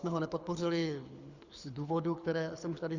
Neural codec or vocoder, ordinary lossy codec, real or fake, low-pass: codec, 24 kHz, 6 kbps, HILCodec; Opus, 64 kbps; fake; 7.2 kHz